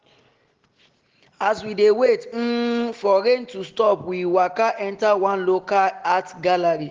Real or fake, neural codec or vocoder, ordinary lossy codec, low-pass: real; none; Opus, 16 kbps; 7.2 kHz